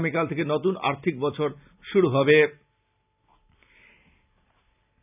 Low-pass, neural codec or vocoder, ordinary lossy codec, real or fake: 3.6 kHz; none; none; real